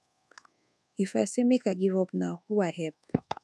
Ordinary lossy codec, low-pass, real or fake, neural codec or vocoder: none; none; fake; codec, 24 kHz, 1.2 kbps, DualCodec